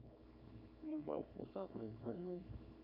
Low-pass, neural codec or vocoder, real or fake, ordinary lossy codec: 5.4 kHz; codec, 24 kHz, 0.9 kbps, WavTokenizer, small release; fake; none